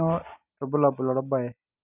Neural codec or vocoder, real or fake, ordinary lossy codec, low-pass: none; real; none; 3.6 kHz